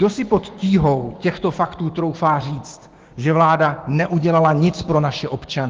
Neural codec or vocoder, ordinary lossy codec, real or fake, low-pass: codec, 16 kHz, 6 kbps, DAC; Opus, 16 kbps; fake; 7.2 kHz